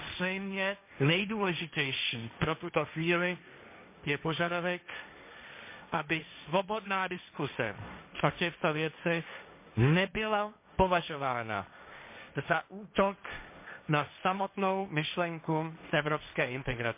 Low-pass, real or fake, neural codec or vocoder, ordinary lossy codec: 3.6 kHz; fake; codec, 16 kHz, 1.1 kbps, Voila-Tokenizer; MP3, 24 kbps